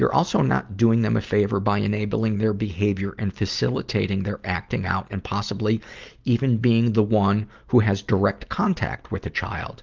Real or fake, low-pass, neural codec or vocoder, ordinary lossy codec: real; 7.2 kHz; none; Opus, 32 kbps